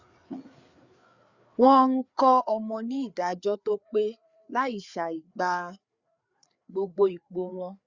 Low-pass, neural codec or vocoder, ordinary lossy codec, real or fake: 7.2 kHz; codec, 16 kHz, 4 kbps, FreqCodec, larger model; Opus, 64 kbps; fake